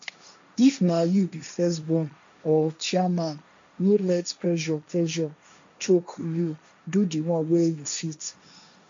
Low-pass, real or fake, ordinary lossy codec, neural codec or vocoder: 7.2 kHz; fake; MP3, 64 kbps; codec, 16 kHz, 1.1 kbps, Voila-Tokenizer